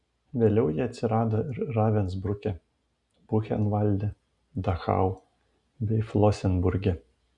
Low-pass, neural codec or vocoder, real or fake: 10.8 kHz; none; real